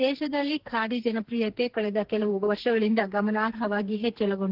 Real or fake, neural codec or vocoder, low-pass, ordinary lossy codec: fake; codec, 44.1 kHz, 2.6 kbps, SNAC; 5.4 kHz; Opus, 16 kbps